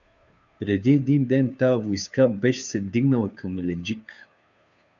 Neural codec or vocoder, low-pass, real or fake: codec, 16 kHz, 2 kbps, FunCodec, trained on Chinese and English, 25 frames a second; 7.2 kHz; fake